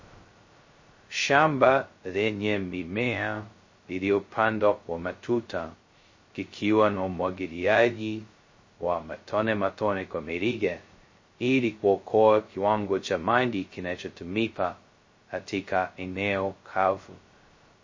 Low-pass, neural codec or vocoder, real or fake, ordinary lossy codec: 7.2 kHz; codec, 16 kHz, 0.2 kbps, FocalCodec; fake; MP3, 32 kbps